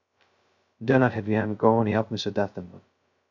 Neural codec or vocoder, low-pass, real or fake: codec, 16 kHz, 0.2 kbps, FocalCodec; 7.2 kHz; fake